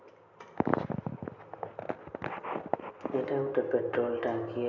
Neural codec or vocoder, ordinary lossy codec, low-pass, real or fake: none; none; 7.2 kHz; real